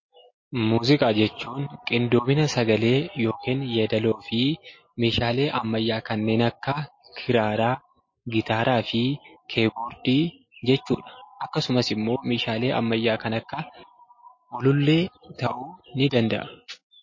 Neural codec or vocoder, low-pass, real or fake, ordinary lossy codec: none; 7.2 kHz; real; MP3, 32 kbps